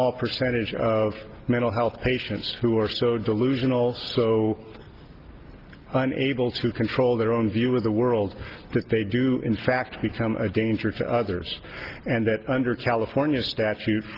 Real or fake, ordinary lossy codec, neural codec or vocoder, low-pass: real; Opus, 16 kbps; none; 5.4 kHz